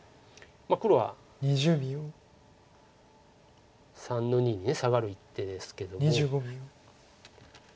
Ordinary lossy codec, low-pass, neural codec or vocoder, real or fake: none; none; none; real